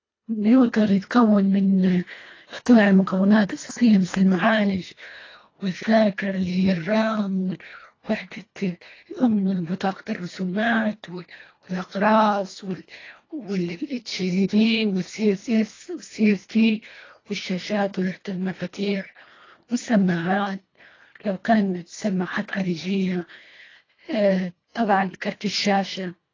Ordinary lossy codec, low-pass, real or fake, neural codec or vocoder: AAC, 32 kbps; 7.2 kHz; fake; codec, 24 kHz, 1.5 kbps, HILCodec